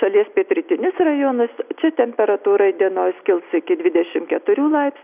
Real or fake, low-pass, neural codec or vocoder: real; 3.6 kHz; none